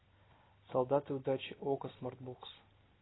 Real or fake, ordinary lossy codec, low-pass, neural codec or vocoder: real; AAC, 16 kbps; 7.2 kHz; none